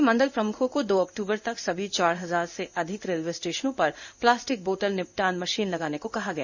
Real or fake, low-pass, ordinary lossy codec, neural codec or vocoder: fake; 7.2 kHz; none; codec, 16 kHz in and 24 kHz out, 1 kbps, XY-Tokenizer